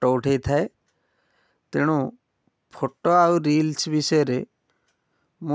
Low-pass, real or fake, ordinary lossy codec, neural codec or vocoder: none; real; none; none